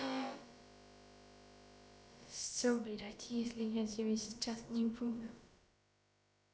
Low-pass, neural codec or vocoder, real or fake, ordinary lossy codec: none; codec, 16 kHz, about 1 kbps, DyCAST, with the encoder's durations; fake; none